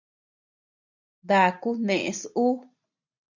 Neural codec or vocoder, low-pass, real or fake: none; 7.2 kHz; real